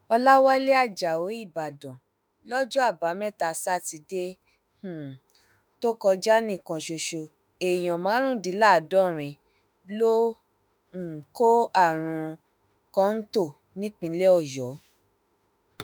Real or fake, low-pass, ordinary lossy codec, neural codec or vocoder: fake; none; none; autoencoder, 48 kHz, 32 numbers a frame, DAC-VAE, trained on Japanese speech